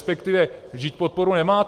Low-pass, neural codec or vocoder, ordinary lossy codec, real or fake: 14.4 kHz; none; Opus, 24 kbps; real